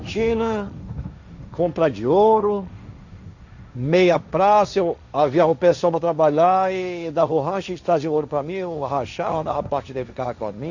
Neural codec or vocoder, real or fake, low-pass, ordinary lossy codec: codec, 16 kHz, 1.1 kbps, Voila-Tokenizer; fake; 7.2 kHz; none